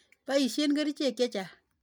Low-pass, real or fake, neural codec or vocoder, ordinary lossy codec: 19.8 kHz; real; none; none